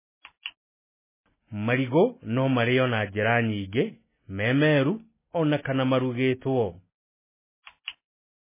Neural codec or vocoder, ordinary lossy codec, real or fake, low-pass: none; MP3, 16 kbps; real; 3.6 kHz